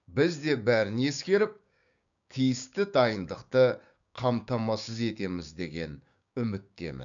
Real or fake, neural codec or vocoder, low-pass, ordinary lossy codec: fake; codec, 16 kHz, 6 kbps, DAC; 7.2 kHz; none